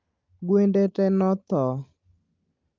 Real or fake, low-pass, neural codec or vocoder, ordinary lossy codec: real; 7.2 kHz; none; Opus, 24 kbps